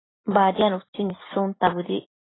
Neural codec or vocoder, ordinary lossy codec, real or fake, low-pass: none; AAC, 16 kbps; real; 7.2 kHz